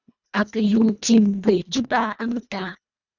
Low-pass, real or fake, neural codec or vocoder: 7.2 kHz; fake; codec, 24 kHz, 1.5 kbps, HILCodec